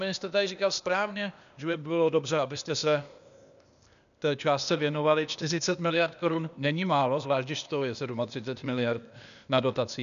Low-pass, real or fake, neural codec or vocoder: 7.2 kHz; fake; codec, 16 kHz, 0.8 kbps, ZipCodec